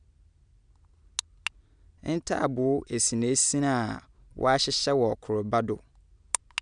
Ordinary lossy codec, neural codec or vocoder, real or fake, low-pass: none; none; real; 9.9 kHz